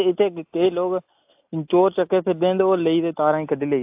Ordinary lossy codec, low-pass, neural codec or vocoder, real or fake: AAC, 32 kbps; 3.6 kHz; none; real